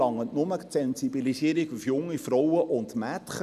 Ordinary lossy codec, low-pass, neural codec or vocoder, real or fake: none; 14.4 kHz; none; real